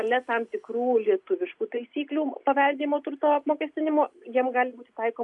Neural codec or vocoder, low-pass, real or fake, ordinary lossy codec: none; 10.8 kHz; real; AAC, 64 kbps